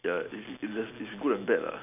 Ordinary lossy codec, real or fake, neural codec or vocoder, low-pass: none; real; none; 3.6 kHz